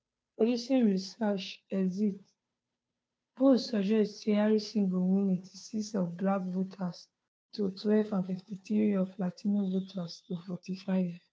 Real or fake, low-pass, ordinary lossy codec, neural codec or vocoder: fake; none; none; codec, 16 kHz, 2 kbps, FunCodec, trained on Chinese and English, 25 frames a second